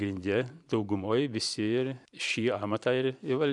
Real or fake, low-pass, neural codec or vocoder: fake; 10.8 kHz; vocoder, 44.1 kHz, 128 mel bands every 512 samples, BigVGAN v2